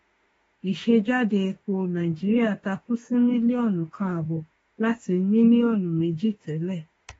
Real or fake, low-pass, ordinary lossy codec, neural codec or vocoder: fake; 19.8 kHz; AAC, 24 kbps; autoencoder, 48 kHz, 32 numbers a frame, DAC-VAE, trained on Japanese speech